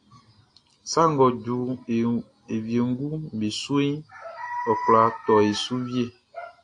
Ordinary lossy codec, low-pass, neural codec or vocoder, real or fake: AAC, 48 kbps; 9.9 kHz; none; real